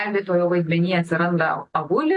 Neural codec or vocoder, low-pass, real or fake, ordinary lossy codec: none; 10.8 kHz; real; AAC, 32 kbps